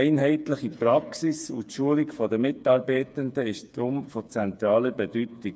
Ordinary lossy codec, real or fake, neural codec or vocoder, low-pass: none; fake; codec, 16 kHz, 4 kbps, FreqCodec, smaller model; none